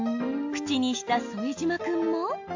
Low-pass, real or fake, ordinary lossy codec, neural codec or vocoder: 7.2 kHz; real; AAC, 48 kbps; none